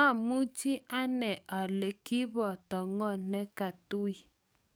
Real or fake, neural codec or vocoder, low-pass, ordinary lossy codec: fake; codec, 44.1 kHz, 7.8 kbps, DAC; none; none